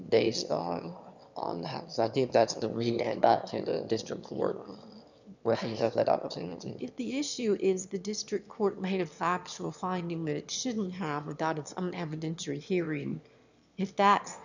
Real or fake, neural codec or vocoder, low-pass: fake; autoencoder, 22.05 kHz, a latent of 192 numbers a frame, VITS, trained on one speaker; 7.2 kHz